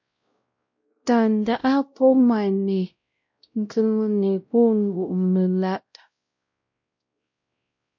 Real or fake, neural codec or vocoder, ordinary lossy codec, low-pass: fake; codec, 16 kHz, 0.5 kbps, X-Codec, WavLM features, trained on Multilingual LibriSpeech; MP3, 48 kbps; 7.2 kHz